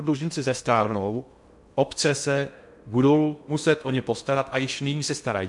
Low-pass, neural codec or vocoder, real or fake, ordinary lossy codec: 10.8 kHz; codec, 16 kHz in and 24 kHz out, 0.6 kbps, FocalCodec, streaming, 2048 codes; fake; MP3, 64 kbps